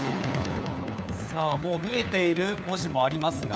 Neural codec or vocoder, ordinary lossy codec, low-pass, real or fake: codec, 16 kHz, 4 kbps, FunCodec, trained on LibriTTS, 50 frames a second; none; none; fake